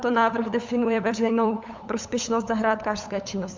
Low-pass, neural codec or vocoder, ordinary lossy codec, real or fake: 7.2 kHz; codec, 16 kHz, 8 kbps, FunCodec, trained on LibriTTS, 25 frames a second; MP3, 64 kbps; fake